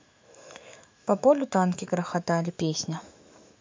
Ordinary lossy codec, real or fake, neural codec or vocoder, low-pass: MP3, 64 kbps; fake; autoencoder, 48 kHz, 128 numbers a frame, DAC-VAE, trained on Japanese speech; 7.2 kHz